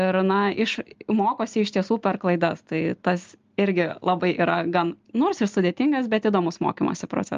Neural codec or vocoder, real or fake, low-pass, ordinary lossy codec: none; real; 7.2 kHz; Opus, 32 kbps